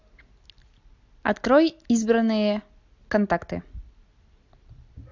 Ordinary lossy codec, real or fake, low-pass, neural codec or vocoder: AAC, 48 kbps; real; 7.2 kHz; none